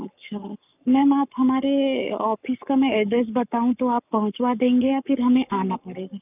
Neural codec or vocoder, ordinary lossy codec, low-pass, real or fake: codec, 44.1 kHz, 7.8 kbps, Pupu-Codec; none; 3.6 kHz; fake